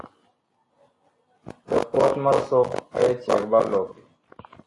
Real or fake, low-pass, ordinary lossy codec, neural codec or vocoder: real; 10.8 kHz; AAC, 32 kbps; none